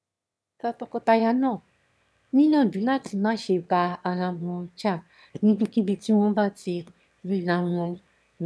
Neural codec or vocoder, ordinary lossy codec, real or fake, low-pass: autoencoder, 22.05 kHz, a latent of 192 numbers a frame, VITS, trained on one speaker; none; fake; none